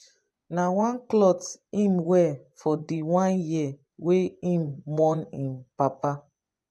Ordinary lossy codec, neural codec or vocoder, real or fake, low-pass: none; vocoder, 24 kHz, 100 mel bands, Vocos; fake; none